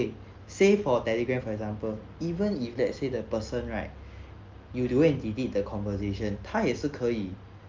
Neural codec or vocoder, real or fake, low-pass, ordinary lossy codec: none; real; 7.2 kHz; Opus, 32 kbps